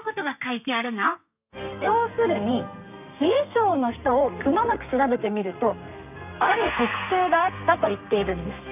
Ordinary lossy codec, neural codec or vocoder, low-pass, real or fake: none; codec, 32 kHz, 1.9 kbps, SNAC; 3.6 kHz; fake